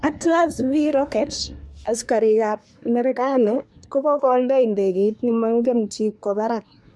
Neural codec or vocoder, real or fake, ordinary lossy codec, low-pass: codec, 24 kHz, 1 kbps, SNAC; fake; none; none